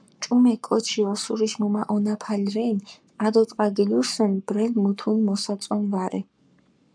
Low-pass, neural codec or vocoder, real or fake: 9.9 kHz; codec, 44.1 kHz, 7.8 kbps, Pupu-Codec; fake